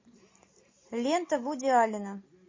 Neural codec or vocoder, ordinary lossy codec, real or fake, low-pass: none; MP3, 32 kbps; real; 7.2 kHz